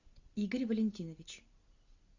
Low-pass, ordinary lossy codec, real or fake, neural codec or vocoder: 7.2 kHz; Opus, 64 kbps; fake; vocoder, 44.1 kHz, 128 mel bands every 256 samples, BigVGAN v2